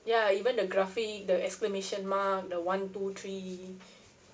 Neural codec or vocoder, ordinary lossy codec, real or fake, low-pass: none; none; real; none